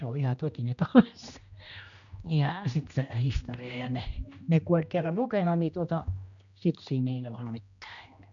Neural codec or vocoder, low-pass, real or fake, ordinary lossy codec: codec, 16 kHz, 1 kbps, X-Codec, HuBERT features, trained on general audio; 7.2 kHz; fake; none